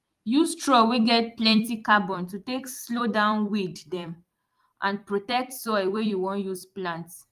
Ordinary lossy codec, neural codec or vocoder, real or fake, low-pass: Opus, 32 kbps; vocoder, 44.1 kHz, 128 mel bands every 256 samples, BigVGAN v2; fake; 14.4 kHz